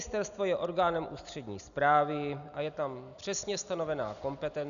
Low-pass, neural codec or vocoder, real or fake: 7.2 kHz; none; real